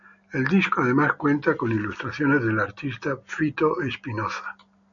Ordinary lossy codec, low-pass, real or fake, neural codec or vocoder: MP3, 96 kbps; 7.2 kHz; real; none